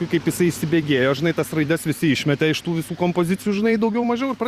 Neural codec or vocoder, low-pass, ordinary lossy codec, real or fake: vocoder, 44.1 kHz, 128 mel bands every 256 samples, BigVGAN v2; 14.4 kHz; Opus, 64 kbps; fake